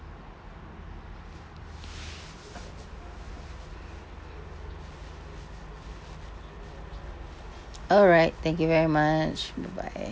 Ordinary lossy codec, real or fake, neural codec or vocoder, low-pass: none; real; none; none